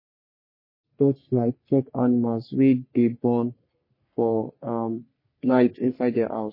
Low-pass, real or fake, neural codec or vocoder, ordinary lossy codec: 5.4 kHz; fake; codec, 44.1 kHz, 3.4 kbps, Pupu-Codec; MP3, 24 kbps